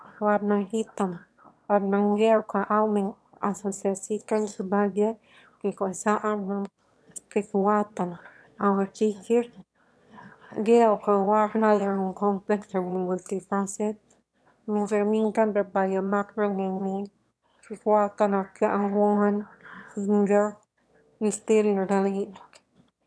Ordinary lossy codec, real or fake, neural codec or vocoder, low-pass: none; fake; autoencoder, 22.05 kHz, a latent of 192 numbers a frame, VITS, trained on one speaker; none